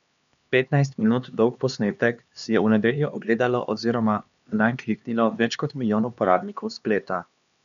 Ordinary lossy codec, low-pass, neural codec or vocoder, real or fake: none; 7.2 kHz; codec, 16 kHz, 2 kbps, X-Codec, HuBERT features, trained on LibriSpeech; fake